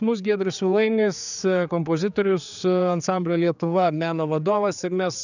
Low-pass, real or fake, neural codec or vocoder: 7.2 kHz; fake; codec, 16 kHz, 4 kbps, X-Codec, HuBERT features, trained on general audio